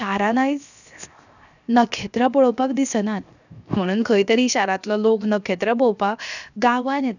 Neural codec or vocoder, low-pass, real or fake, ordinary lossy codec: codec, 16 kHz, 0.7 kbps, FocalCodec; 7.2 kHz; fake; none